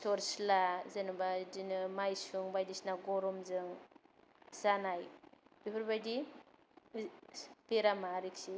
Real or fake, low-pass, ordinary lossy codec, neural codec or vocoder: real; none; none; none